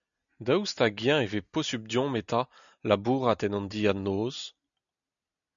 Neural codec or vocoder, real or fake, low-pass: none; real; 7.2 kHz